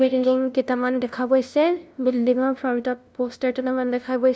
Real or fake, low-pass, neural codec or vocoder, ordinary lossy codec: fake; none; codec, 16 kHz, 0.5 kbps, FunCodec, trained on LibriTTS, 25 frames a second; none